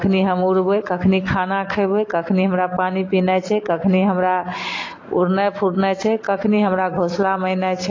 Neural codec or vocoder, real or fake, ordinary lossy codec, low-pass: none; real; AAC, 32 kbps; 7.2 kHz